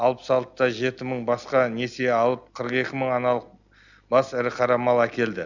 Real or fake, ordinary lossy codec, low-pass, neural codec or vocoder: real; none; 7.2 kHz; none